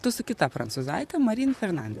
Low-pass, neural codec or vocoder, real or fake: 14.4 kHz; vocoder, 44.1 kHz, 128 mel bands, Pupu-Vocoder; fake